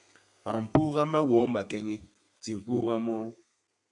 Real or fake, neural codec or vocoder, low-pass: fake; codec, 32 kHz, 1.9 kbps, SNAC; 10.8 kHz